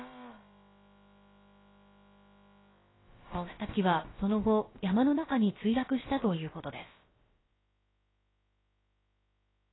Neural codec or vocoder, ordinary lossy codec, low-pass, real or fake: codec, 16 kHz, about 1 kbps, DyCAST, with the encoder's durations; AAC, 16 kbps; 7.2 kHz; fake